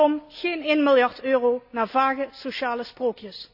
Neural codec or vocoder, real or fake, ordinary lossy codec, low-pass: none; real; none; 5.4 kHz